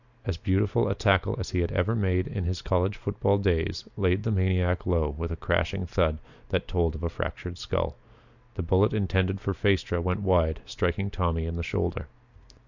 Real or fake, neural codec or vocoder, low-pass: real; none; 7.2 kHz